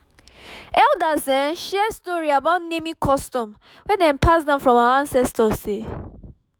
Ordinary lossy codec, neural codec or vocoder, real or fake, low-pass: none; autoencoder, 48 kHz, 128 numbers a frame, DAC-VAE, trained on Japanese speech; fake; none